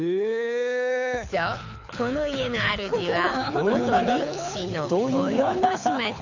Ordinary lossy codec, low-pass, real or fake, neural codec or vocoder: none; 7.2 kHz; fake; codec, 24 kHz, 6 kbps, HILCodec